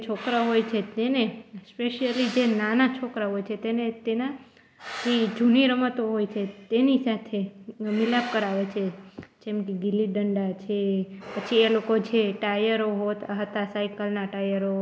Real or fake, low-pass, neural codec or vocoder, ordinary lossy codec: real; none; none; none